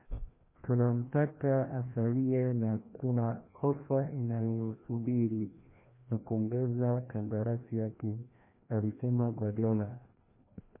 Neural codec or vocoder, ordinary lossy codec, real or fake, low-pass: codec, 16 kHz, 1 kbps, FreqCodec, larger model; MP3, 24 kbps; fake; 3.6 kHz